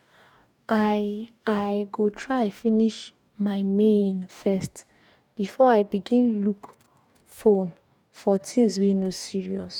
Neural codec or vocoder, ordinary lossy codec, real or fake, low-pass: codec, 44.1 kHz, 2.6 kbps, DAC; none; fake; 19.8 kHz